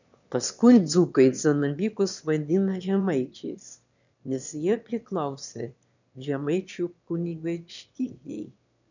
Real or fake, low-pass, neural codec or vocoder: fake; 7.2 kHz; autoencoder, 22.05 kHz, a latent of 192 numbers a frame, VITS, trained on one speaker